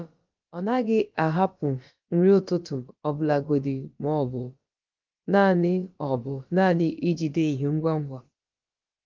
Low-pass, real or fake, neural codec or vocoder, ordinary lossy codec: 7.2 kHz; fake; codec, 16 kHz, about 1 kbps, DyCAST, with the encoder's durations; Opus, 32 kbps